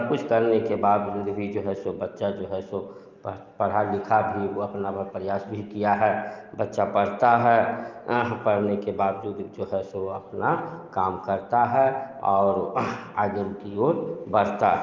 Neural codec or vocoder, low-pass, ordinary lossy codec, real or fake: none; 7.2 kHz; Opus, 24 kbps; real